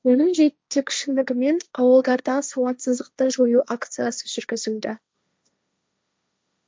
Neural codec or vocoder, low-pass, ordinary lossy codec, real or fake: codec, 16 kHz, 1.1 kbps, Voila-Tokenizer; none; none; fake